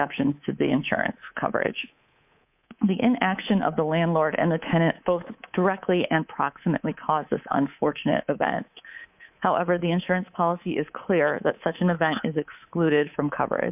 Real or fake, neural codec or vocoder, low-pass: fake; codec, 24 kHz, 3.1 kbps, DualCodec; 3.6 kHz